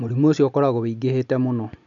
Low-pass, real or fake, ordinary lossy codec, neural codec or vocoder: 7.2 kHz; real; none; none